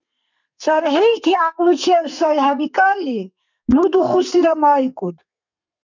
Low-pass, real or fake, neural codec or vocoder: 7.2 kHz; fake; codec, 32 kHz, 1.9 kbps, SNAC